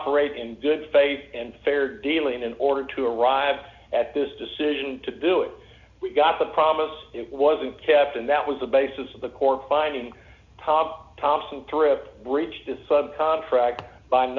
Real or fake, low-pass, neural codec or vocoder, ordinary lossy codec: real; 7.2 kHz; none; Opus, 64 kbps